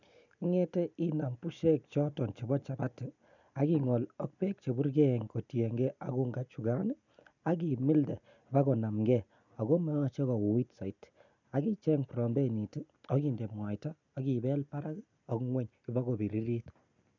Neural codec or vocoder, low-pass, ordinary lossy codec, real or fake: none; 7.2 kHz; none; real